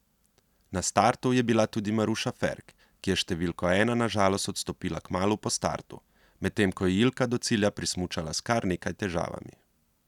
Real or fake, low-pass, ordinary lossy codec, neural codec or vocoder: real; 19.8 kHz; none; none